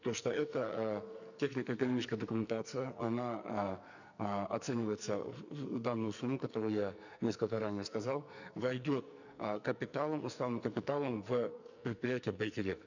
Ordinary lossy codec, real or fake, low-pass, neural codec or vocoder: none; fake; 7.2 kHz; codec, 44.1 kHz, 2.6 kbps, SNAC